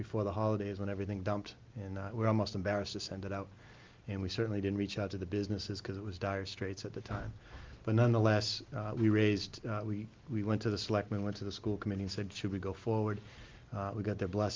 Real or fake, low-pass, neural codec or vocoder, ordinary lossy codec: real; 7.2 kHz; none; Opus, 32 kbps